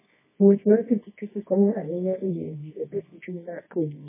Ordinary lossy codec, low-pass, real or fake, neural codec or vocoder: MP3, 16 kbps; 3.6 kHz; fake; codec, 24 kHz, 0.9 kbps, WavTokenizer, medium music audio release